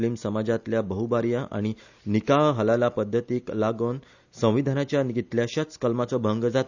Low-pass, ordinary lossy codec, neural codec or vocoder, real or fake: 7.2 kHz; none; none; real